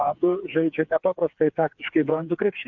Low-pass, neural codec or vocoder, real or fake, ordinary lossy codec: 7.2 kHz; codec, 16 kHz, 4 kbps, FreqCodec, smaller model; fake; MP3, 48 kbps